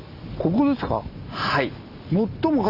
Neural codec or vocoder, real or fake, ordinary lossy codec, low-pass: none; real; Opus, 64 kbps; 5.4 kHz